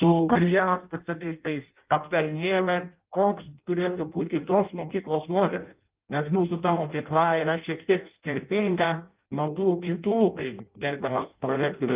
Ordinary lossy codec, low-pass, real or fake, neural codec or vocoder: Opus, 24 kbps; 3.6 kHz; fake; codec, 16 kHz in and 24 kHz out, 0.6 kbps, FireRedTTS-2 codec